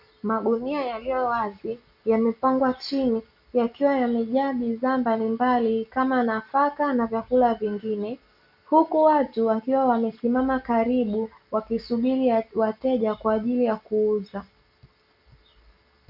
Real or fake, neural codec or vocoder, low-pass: real; none; 5.4 kHz